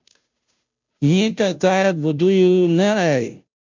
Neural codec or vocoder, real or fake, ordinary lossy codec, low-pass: codec, 16 kHz, 0.5 kbps, FunCodec, trained on Chinese and English, 25 frames a second; fake; MP3, 64 kbps; 7.2 kHz